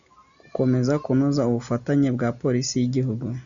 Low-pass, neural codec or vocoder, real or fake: 7.2 kHz; none; real